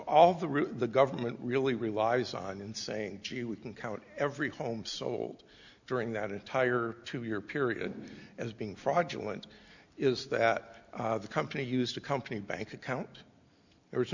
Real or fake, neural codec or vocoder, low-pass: real; none; 7.2 kHz